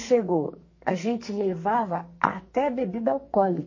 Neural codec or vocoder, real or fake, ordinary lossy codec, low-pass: codec, 44.1 kHz, 2.6 kbps, SNAC; fake; MP3, 32 kbps; 7.2 kHz